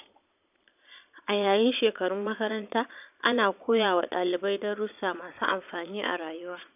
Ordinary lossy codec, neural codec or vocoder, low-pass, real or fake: none; vocoder, 22.05 kHz, 80 mel bands, Vocos; 3.6 kHz; fake